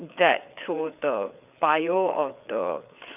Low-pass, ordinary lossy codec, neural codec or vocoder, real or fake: 3.6 kHz; none; codec, 16 kHz, 4 kbps, FreqCodec, larger model; fake